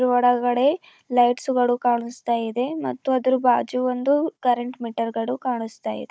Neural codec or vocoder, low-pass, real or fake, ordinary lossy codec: codec, 16 kHz, 16 kbps, FunCodec, trained on Chinese and English, 50 frames a second; none; fake; none